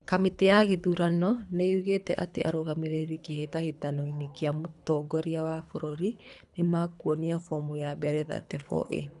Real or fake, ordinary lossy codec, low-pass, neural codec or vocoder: fake; none; 10.8 kHz; codec, 24 kHz, 3 kbps, HILCodec